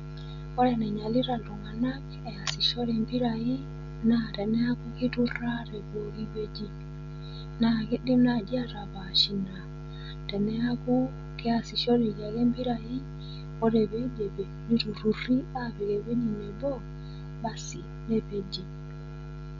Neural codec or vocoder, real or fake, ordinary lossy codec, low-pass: none; real; none; 7.2 kHz